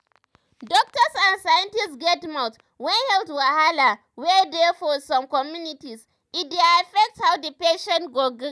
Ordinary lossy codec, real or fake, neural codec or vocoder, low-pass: none; real; none; none